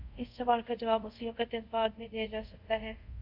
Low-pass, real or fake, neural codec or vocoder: 5.4 kHz; fake; codec, 24 kHz, 0.5 kbps, DualCodec